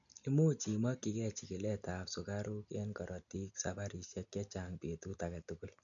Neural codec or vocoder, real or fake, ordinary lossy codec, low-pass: none; real; none; 7.2 kHz